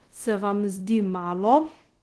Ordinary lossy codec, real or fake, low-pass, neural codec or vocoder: Opus, 16 kbps; fake; 10.8 kHz; codec, 24 kHz, 0.5 kbps, DualCodec